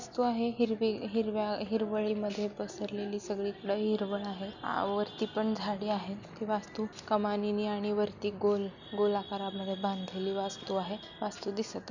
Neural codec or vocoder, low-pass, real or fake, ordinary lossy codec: none; 7.2 kHz; real; none